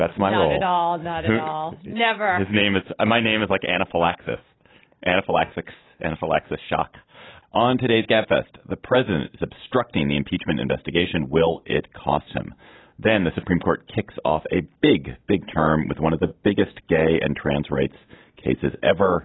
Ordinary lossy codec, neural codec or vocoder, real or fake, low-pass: AAC, 16 kbps; none; real; 7.2 kHz